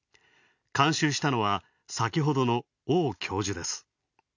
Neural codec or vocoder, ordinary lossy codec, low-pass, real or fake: none; none; 7.2 kHz; real